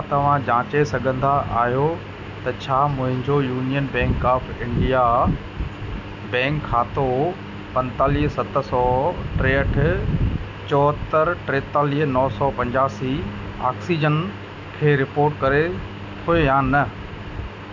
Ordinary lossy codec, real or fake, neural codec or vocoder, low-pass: none; real; none; 7.2 kHz